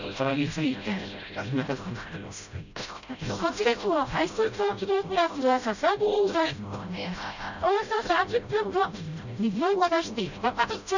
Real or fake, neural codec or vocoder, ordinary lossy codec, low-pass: fake; codec, 16 kHz, 0.5 kbps, FreqCodec, smaller model; none; 7.2 kHz